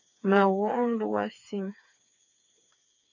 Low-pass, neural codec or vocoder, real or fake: 7.2 kHz; codec, 16 kHz, 4 kbps, FreqCodec, smaller model; fake